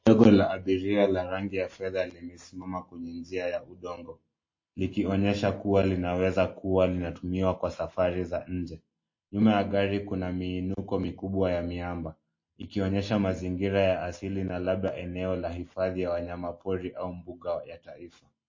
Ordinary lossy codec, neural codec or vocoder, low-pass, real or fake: MP3, 32 kbps; none; 7.2 kHz; real